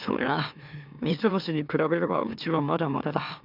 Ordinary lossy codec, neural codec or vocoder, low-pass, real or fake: none; autoencoder, 44.1 kHz, a latent of 192 numbers a frame, MeloTTS; 5.4 kHz; fake